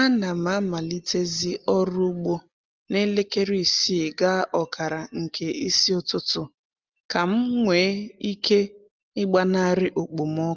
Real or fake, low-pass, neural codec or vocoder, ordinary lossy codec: real; 7.2 kHz; none; Opus, 32 kbps